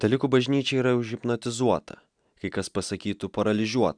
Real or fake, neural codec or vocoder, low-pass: real; none; 9.9 kHz